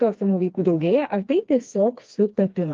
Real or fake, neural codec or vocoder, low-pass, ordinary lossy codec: fake; codec, 16 kHz, 2 kbps, FreqCodec, smaller model; 7.2 kHz; Opus, 32 kbps